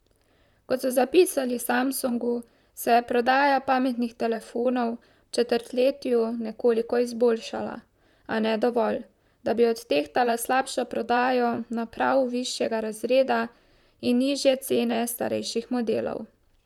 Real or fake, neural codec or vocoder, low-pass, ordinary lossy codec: fake; vocoder, 44.1 kHz, 128 mel bands, Pupu-Vocoder; 19.8 kHz; none